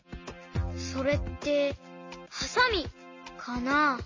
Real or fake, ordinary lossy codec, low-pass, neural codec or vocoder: real; MP3, 32 kbps; 7.2 kHz; none